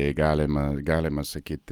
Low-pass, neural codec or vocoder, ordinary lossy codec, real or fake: 19.8 kHz; none; Opus, 32 kbps; real